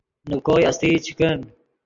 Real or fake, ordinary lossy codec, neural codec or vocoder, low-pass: real; AAC, 64 kbps; none; 7.2 kHz